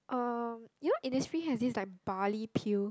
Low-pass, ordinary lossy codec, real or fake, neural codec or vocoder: none; none; real; none